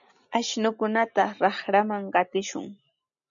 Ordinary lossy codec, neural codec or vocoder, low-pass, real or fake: MP3, 96 kbps; none; 7.2 kHz; real